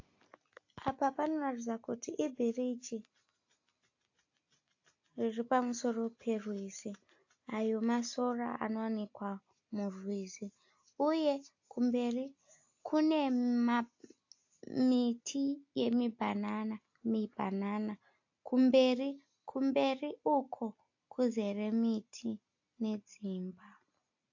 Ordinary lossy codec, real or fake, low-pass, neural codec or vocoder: AAC, 48 kbps; real; 7.2 kHz; none